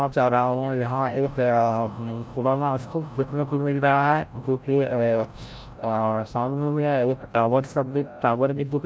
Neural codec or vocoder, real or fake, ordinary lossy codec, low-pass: codec, 16 kHz, 0.5 kbps, FreqCodec, larger model; fake; none; none